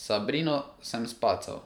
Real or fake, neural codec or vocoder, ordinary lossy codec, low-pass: fake; vocoder, 44.1 kHz, 128 mel bands every 512 samples, BigVGAN v2; none; 19.8 kHz